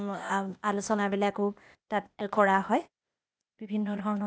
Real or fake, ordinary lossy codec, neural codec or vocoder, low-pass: fake; none; codec, 16 kHz, 0.8 kbps, ZipCodec; none